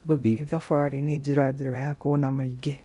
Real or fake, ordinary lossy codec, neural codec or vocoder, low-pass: fake; none; codec, 16 kHz in and 24 kHz out, 0.6 kbps, FocalCodec, streaming, 2048 codes; 10.8 kHz